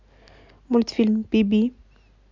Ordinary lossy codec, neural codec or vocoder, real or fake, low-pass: MP3, 64 kbps; none; real; 7.2 kHz